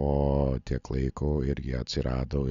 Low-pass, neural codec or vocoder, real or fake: 7.2 kHz; none; real